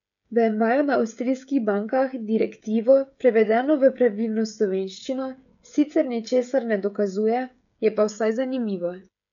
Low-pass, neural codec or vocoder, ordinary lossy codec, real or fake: 7.2 kHz; codec, 16 kHz, 16 kbps, FreqCodec, smaller model; none; fake